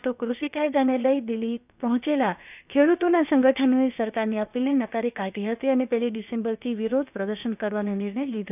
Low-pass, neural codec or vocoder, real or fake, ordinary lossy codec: 3.6 kHz; codec, 16 kHz, about 1 kbps, DyCAST, with the encoder's durations; fake; none